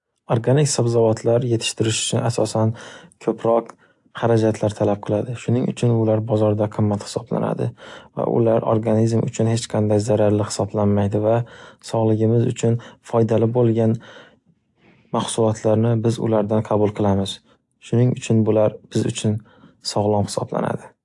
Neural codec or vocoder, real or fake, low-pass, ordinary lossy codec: none; real; 10.8 kHz; AAC, 64 kbps